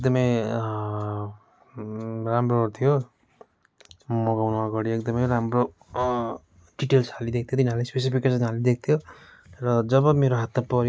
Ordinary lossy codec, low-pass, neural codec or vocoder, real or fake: none; none; none; real